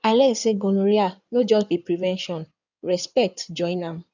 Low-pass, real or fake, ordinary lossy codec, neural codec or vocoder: 7.2 kHz; fake; none; codec, 16 kHz in and 24 kHz out, 2.2 kbps, FireRedTTS-2 codec